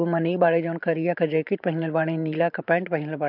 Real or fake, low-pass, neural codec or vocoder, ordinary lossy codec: fake; 5.4 kHz; vocoder, 44.1 kHz, 128 mel bands every 512 samples, BigVGAN v2; MP3, 48 kbps